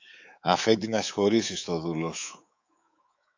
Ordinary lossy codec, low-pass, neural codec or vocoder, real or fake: AAC, 48 kbps; 7.2 kHz; codec, 24 kHz, 3.1 kbps, DualCodec; fake